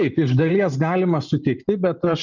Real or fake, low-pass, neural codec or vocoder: real; 7.2 kHz; none